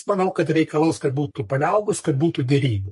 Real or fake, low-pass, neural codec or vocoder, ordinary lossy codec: fake; 14.4 kHz; codec, 44.1 kHz, 3.4 kbps, Pupu-Codec; MP3, 48 kbps